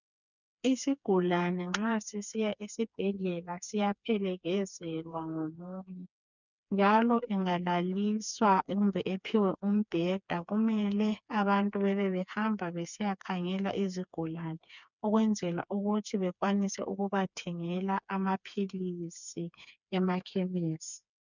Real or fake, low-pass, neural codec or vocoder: fake; 7.2 kHz; codec, 16 kHz, 4 kbps, FreqCodec, smaller model